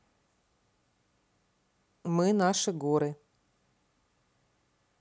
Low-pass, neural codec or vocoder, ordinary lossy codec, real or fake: none; none; none; real